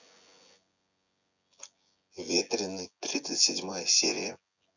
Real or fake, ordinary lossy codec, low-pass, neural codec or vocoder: fake; none; 7.2 kHz; vocoder, 24 kHz, 100 mel bands, Vocos